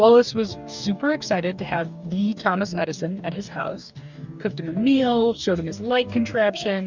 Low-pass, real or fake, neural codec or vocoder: 7.2 kHz; fake; codec, 44.1 kHz, 2.6 kbps, DAC